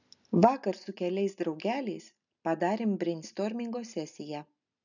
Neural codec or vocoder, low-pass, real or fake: none; 7.2 kHz; real